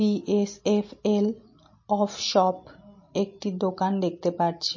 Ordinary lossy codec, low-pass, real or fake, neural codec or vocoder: MP3, 32 kbps; 7.2 kHz; real; none